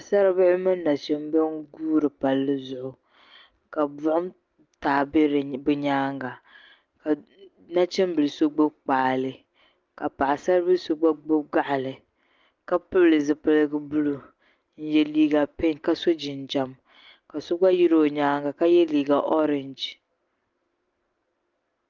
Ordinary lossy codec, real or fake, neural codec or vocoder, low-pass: Opus, 32 kbps; real; none; 7.2 kHz